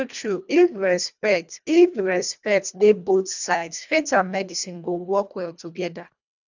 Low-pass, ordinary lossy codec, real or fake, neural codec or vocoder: 7.2 kHz; none; fake; codec, 24 kHz, 1.5 kbps, HILCodec